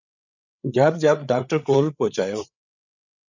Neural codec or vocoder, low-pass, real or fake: codec, 16 kHz, 8 kbps, FreqCodec, larger model; 7.2 kHz; fake